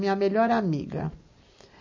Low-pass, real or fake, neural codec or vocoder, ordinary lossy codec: 7.2 kHz; real; none; MP3, 32 kbps